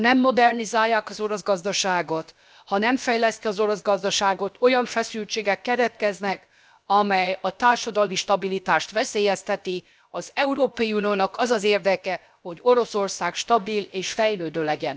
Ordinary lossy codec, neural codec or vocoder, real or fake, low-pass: none; codec, 16 kHz, about 1 kbps, DyCAST, with the encoder's durations; fake; none